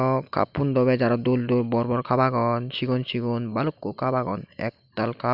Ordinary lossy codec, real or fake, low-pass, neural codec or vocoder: none; real; 5.4 kHz; none